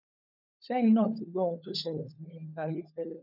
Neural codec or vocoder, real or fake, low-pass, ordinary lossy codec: codec, 16 kHz, 16 kbps, FunCodec, trained on LibriTTS, 50 frames a second; fake; 5.4 kHz; none